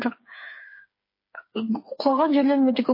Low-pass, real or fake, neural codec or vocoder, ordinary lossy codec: 5.4 kHz; fake; codec, 16 kHz, 4 kbps, FreqCodec, smaller model; MP3, 24 kbps